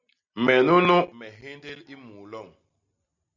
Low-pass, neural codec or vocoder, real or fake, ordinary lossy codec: 7.2 kHz; none; real; Opus, 64 kbps